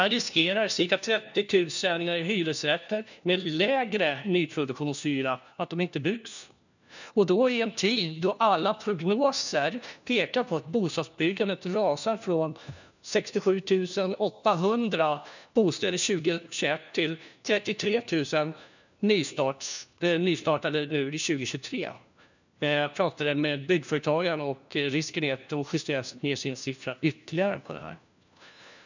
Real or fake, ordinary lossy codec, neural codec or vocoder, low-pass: fake; none; codec, 16 kHz, 1 kbps, FunCodec, trained on LibriTTS, 50 frames a second; 7.2 kHz